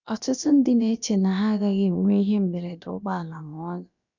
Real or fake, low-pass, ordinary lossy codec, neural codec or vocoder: fake; 7.2 kHz; none; codec, 16 kHz, about 1 kbps, DyCAST, with the encoder's durations